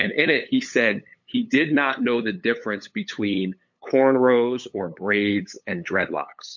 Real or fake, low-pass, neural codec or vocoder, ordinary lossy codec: fake; 7.2 kHz; codec, 16 kHz, 8 kbps, FunCodec, trained on LibriTTS, 25 frames a second; MP3, 48 kbps